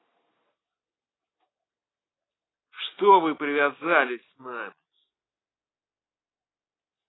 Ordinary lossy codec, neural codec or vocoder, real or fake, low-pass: AAC, 16 kbps; none; real; 7.2 kHz